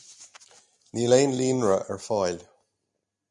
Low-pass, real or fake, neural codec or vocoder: 10.8 kHz; real; none